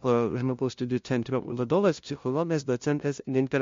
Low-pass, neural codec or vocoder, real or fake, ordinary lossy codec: 7.2 kHz; codec, 16 kHz, 0.5 kbps, FunCodec, trained on LibriTTS, 25 frames a second; fake; MP3, 48 kbps